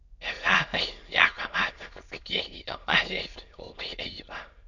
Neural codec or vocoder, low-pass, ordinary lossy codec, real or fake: autoencoder, 22.05 kHz, a latent of 192 numbers a frame, VITS, trained on many speakers; 7.2 kHz; none; fake